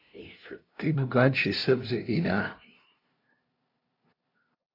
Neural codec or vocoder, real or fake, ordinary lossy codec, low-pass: codec, 16 kHz, 0.5 kbps, FunCodec, trained on LibriTTS, 25 frames a second; fake; AAC, 32 kbps; 5.4 kHz